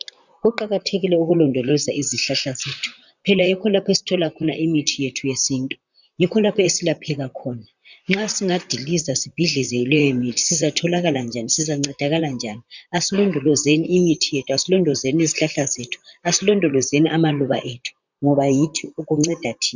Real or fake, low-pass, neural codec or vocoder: fake; 7.2 kHz; vocoder, 44.1 kHz, 128 mel bands, Pupu-Vocoder